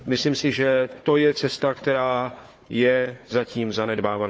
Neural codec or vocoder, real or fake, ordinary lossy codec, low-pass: codec, 16 kHz, 4 kbps, FunCodec, trained on Chinese and English, 50 frames a second; fake; none; none